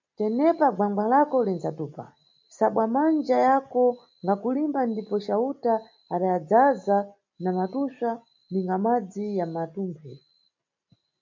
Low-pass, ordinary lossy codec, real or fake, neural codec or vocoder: 7.2 kHz; MP3, 48 kbps; real; none